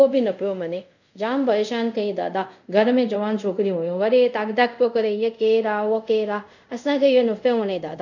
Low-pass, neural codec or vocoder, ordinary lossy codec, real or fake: 7.2 kHz; codec, 24 kHz, 0.5 kbps, DualCodec; none; fake